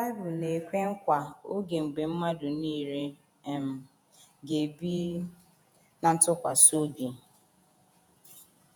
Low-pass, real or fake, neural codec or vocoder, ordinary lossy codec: none; fake; vocoder, 48 kHz, 128 mel bands, Vocos; none